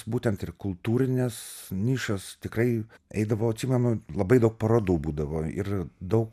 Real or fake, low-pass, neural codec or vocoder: real; 14.4 kHz; none